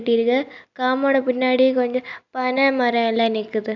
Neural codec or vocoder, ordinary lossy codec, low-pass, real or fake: none; none; 7.2 kHz; real